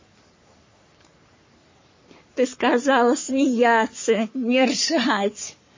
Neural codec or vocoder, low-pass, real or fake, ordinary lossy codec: codec, 44.1 kHz, 3.4 kbps, Pupu-Codec; 7.2 kHz; fake; MP3, 32 kbps